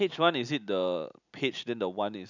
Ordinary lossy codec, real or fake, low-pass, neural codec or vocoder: none; real; 7.2 kHz; none